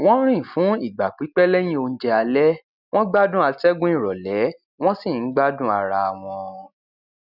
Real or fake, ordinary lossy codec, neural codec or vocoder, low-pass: real; none; none; 5.4 kHz